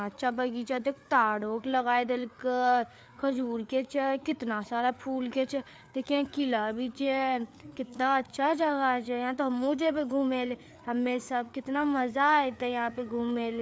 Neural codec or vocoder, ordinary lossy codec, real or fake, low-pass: codec, 16 kHz, 4 kbps, FreqCodec, larger model; none; fake; none